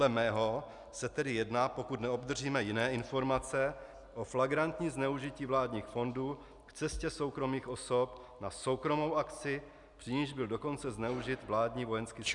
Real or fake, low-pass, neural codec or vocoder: real; 10.8 kHz; none